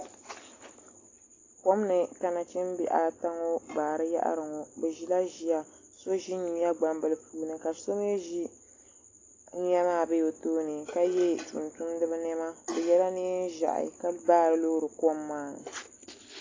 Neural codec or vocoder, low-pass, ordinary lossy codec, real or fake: none; 7.2 kHz; AAC, 32 kbps; real